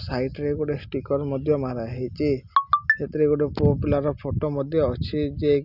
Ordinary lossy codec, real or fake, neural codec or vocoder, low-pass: none; real; none; 5.4 kHz